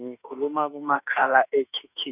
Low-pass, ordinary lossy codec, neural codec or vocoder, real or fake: 3.6 kHz; none; autoencoder, 48 kHz, 32 numbers a frame, DAC-VAE, trained on Japanese speech; fake